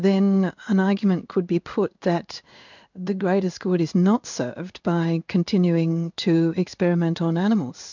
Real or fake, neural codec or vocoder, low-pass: fake; codec, 16 kHz in and 24 kHz out, 1 kbps, XY-Tokenizer; 7.2 kHz